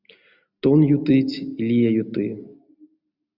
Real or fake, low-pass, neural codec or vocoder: real; 5.4 kHz; none